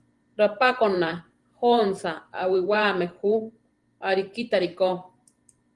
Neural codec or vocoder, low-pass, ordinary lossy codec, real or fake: vocoder, 44.1 kHz, 128 mel bands every 512 samples, BigVGAN v2; 10.8 kHz; Opus, 24 kbps; fake